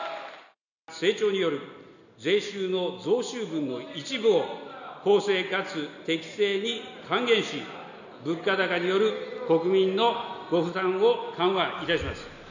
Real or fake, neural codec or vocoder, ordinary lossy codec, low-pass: real; none; none; 7.2 kHz